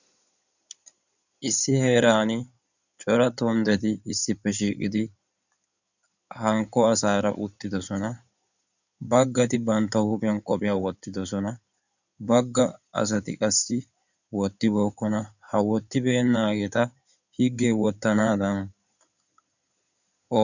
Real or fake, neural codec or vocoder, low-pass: fake; codec, 16 kHz in and 24 kHz out, 2.2 kbps, FireRedTTS-2 codec; 7.2 kHz